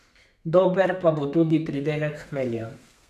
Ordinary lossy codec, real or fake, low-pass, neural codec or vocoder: none; fake; 14.4 kHz; codec, 32 kHz, 1.9 kbps, SNAC